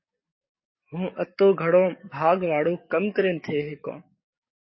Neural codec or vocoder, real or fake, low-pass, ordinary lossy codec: codec, 44.1 kHz, 7.8 kbps, DAC; fake; 7.2 kHz; MP3, 24 kbps